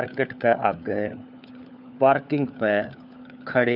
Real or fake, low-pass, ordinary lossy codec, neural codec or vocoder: fake; 5.4 kHz; none; codec, 16 kHz, 4 kbps, FunCodec, trained on LibriTTS, 50 frames a second